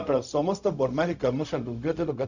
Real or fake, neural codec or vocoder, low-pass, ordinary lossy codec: fake; codec, 16 kHz, 0.4 kbps, LongCat-Audio-Codec; 7.2 kHz; none